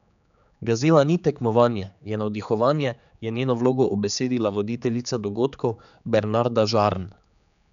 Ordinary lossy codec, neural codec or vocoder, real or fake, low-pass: none; codec, 16 kHz, 4 kbps, X-Codec, HuBERT features, trained on general audio; fake; 7.2 kHz